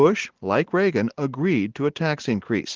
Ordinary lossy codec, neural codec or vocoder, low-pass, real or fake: Opus, 16 kbps; none; 7.2 kHz; real